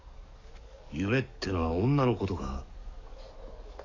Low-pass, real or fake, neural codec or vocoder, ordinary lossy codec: 7.2 kHz; fake; codec, 44.1 kHz, 7.8 kbps, DAC; none